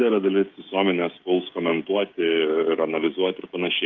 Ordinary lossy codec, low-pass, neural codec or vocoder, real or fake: Opus, 24 kbps; 7.2 kHz; codec, 16 kHz, 16 kbps, FreqCodec, smaller model; fake